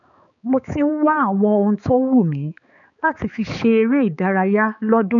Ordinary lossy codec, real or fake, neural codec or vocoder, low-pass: none; fake; codec, 16 kHz, 4 kbps, X-Codec, HuBERT features, trained on balanced general audio; 7.2 kHz